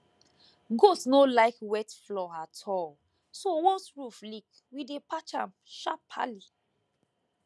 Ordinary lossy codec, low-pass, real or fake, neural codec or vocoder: none; none; real; none